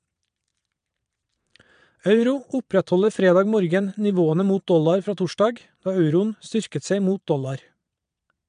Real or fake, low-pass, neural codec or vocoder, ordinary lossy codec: fake; 9.9 kHz; vocoder, 22.05 kHz, 80 mel bands, Vocos; MP3, 96 kbps